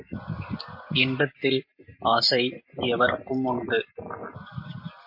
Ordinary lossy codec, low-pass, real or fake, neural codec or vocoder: MP3, 32 kbps; 5.4 kHz; real; none